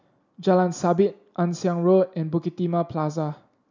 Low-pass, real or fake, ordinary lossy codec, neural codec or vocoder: 7.2 kHz; real; none; none